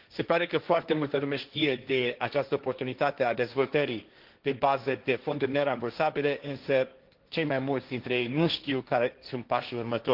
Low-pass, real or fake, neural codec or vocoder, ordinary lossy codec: 5.4 kHz; fake; codec, 16 kHz, 1.1 kbps, Voila-Tokenizer; Opus, 32 kbps